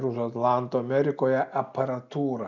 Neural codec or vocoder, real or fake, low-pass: none; real; 7.2 kHz